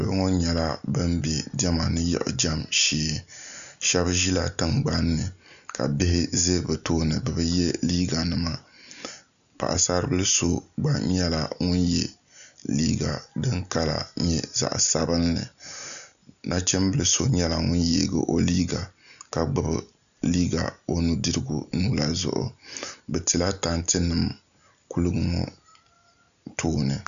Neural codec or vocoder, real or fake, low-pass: none; real; 7.2 kHz